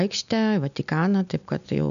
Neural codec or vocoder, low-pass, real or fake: none; 7.2 kHz; real